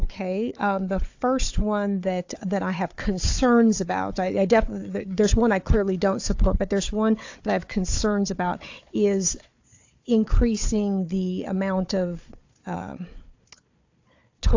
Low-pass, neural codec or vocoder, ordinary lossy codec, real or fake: 7.2 kHz; codec, 16 kHz, 4 kbps, FunCodec, trained on Chinese and English, 50 frames a second; AAC, 48 kbps; fake